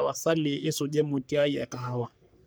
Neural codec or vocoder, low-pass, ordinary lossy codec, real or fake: codec, 44.1 kHz, 3.4 kbps, Pupu-Codec; none; none; fake